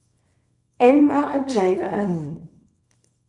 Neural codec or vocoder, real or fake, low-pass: codec, 24 kHz, 0.9 kbps, WavTokenizer, small release; fake; 10.8 kHz